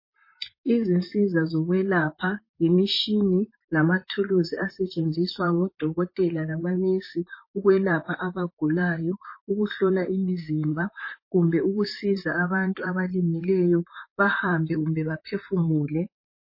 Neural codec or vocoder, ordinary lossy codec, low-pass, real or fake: vocoder, 44.1 kHz, 128 mel bands, Pupu-Vocoder; MP3, 24 kbps; 5.4 kHz; fake